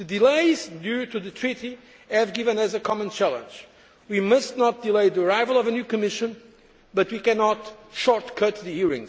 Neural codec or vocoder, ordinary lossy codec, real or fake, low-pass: none; none; real; none